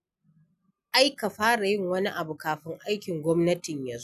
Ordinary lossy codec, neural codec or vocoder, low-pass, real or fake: none; none; 14.4 kHz; real